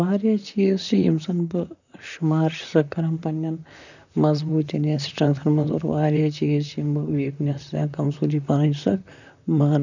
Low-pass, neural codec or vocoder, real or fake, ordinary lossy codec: 7.2 kHz; vocoder, 44.1 kHz, 128 mel bands, Pupu-Vocoder; fake; none